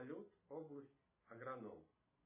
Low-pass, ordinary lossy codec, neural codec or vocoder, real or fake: 3.6 kHz; MP3, 16 kbps; none; real